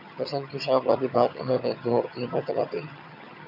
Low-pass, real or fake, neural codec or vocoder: 5.4 kHz; fake; vocoder, 22.05 kHz, 80 mel bands, HiFi-GAN